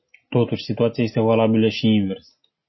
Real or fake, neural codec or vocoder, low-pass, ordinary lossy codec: real; none; 7.2 kHz; MP3, 24 kbps